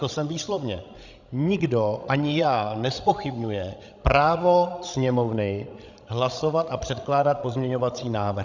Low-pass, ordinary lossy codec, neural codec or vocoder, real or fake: 7.2 kHz; Opus, 64 kbps; codec, 16 kHz, 16 kbps, FreqCodec, larger model; fake